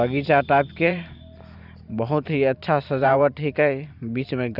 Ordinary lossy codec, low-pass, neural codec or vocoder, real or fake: none; 5.4 kHz; vocoder, 44.1 kHz, 128 mel bands every 512 samples, BigVGAN v2; fake